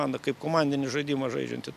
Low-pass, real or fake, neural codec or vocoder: 14.4 kHz; real; none